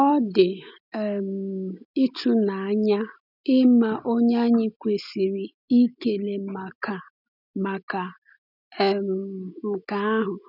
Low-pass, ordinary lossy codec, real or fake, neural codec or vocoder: 5.4 kHz; none; real; none